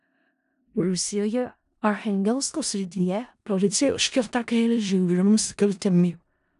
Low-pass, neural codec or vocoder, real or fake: 10.8 kHz; codec, 16 kHz in and 24 kHz out, 0.4 kbps, LongCat-Audio-Codec, four codebook decoder; fake